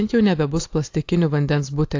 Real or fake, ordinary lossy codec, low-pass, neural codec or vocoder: real; AAC, 48 kbps; 7.2 kHz; none